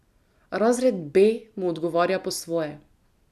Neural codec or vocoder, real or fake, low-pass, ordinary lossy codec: none; real; 14.4 kHz; none